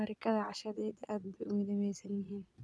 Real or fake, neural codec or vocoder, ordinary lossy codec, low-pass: fake; vocoder, 22.05 kHz, 80 mel bands, Vocos; none; none